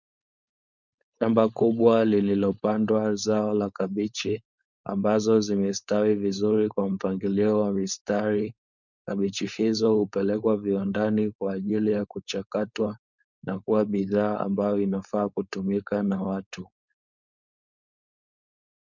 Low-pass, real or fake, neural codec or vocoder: 7.2 kHz; fake; codec, 16 kHz, 4.8 kbps, FACodec